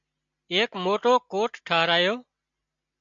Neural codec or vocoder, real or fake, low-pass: none; real; 7.2 kHz